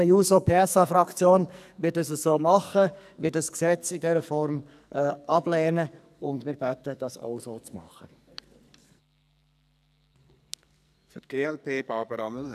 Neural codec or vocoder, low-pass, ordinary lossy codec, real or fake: codec, 44.1 kHz, 2.6 kbps, SNAC; 14.4 kHz; none; fake